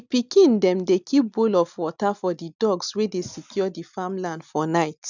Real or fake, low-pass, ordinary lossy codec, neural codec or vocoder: real; 7.2 kHz; none; none